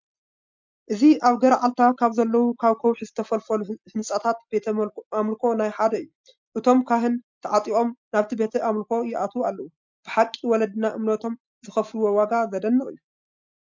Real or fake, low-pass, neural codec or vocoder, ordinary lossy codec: real; 7.2 kHz; none; MP3, 64 kbps